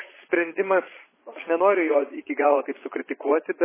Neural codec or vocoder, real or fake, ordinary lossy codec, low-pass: vocoder, 22.05 kHz, 80 mel bands, Vocos; fake; MP3, 16 kbps; 3.6 kHz